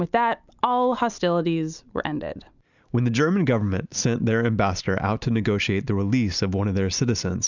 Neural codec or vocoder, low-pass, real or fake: none; 7.2 kHz; real